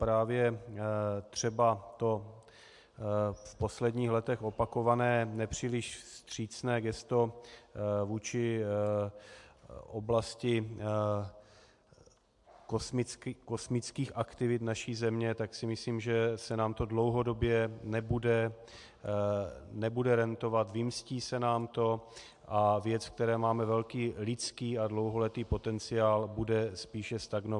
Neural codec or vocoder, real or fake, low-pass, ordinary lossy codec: none; real; 10.8 kHz; MP3, 64 kbps